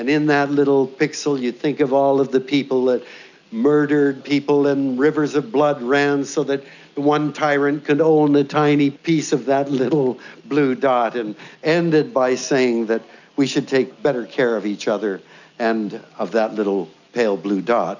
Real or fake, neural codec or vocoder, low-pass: real; none; 7.2 kHz